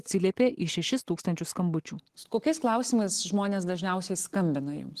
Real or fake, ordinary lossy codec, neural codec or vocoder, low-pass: real; Opus, 16 kbps; none; 14.4 kHz